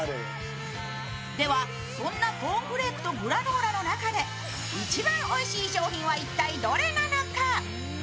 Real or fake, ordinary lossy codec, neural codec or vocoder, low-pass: real; none; none; none